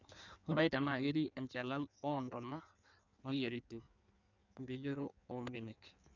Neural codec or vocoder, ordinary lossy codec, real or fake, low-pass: codec, 16 kHz in and 24 kHz out, 1.1 kbps, FireRedTTS-2 codec; none; fake; 7.2 kHz